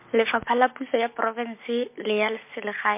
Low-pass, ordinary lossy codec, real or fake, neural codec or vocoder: 3.6 kHz; MP3, 24 kbps; real; none